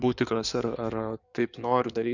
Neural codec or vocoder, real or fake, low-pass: codec, 16 kHz in and 24 kHz out, 2.2 kbps, FireRedTTS-2 codec; fake; 7.2 kHz